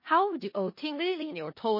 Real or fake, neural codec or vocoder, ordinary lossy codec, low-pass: fake; codec, 16 kHz in and 24 kHz out, 0.4 kbps, LongCat-Audio-Codec, four codebook decoder; MP3, 24 kbps; 5.4 kHz